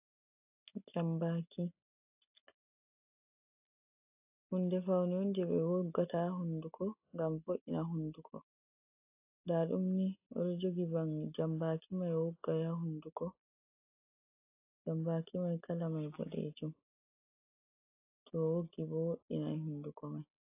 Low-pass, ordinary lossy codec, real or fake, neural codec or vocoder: 3.6 kHz; AAC, 32 kbps; real; none